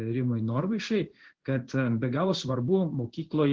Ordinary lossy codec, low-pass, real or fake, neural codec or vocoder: Opus, 16 kbps; 7.2 kHz; fake; codec, 16 kHz in and 24 kHz out, 1 kbps, XY-Tokenizer